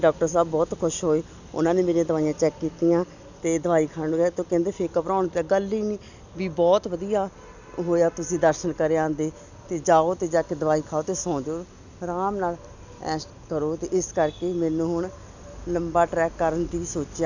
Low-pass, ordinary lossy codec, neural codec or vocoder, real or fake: 7.2 kHz; none; none; real